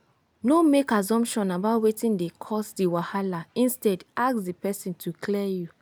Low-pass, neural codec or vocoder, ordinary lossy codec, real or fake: none; none; none; real